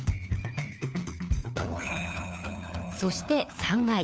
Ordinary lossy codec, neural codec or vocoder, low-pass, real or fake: none; codec, 16 kHz, 4 kbps, FunCodec, trained on LibriTTS, 50 frames a second; none; fake